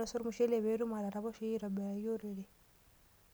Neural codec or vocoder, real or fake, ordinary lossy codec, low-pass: none; real; none; none